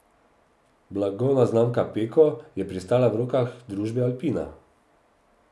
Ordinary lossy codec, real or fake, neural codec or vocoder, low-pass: none; real; none; none